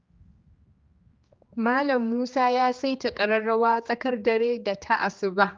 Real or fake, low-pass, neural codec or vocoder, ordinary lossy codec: fake; 7.2 kHz; codec, 16 kHz, 2 kbps, X-Codec, HuBERT features, trained on general audio; Opus, 24 kbps